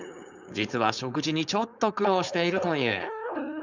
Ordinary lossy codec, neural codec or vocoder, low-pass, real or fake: none; codec, 16 kHz, 4.8 kbps, FACodec; 7.2 kHz; fake